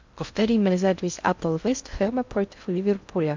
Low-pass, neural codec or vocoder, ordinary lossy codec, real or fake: 7.2 kHz; codec, 16 kHz in and 24 kHz out, 0.6 kbps, FocalCodec, streaming, 2048 codes; MP3, 64 kbps; fake